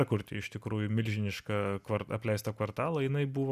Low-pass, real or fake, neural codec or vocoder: 14.4 kHz; real; none